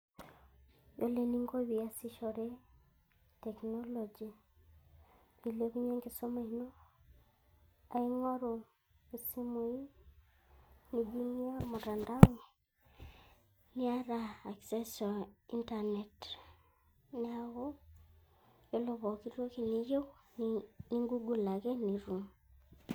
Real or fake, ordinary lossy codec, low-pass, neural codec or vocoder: real; none; none; none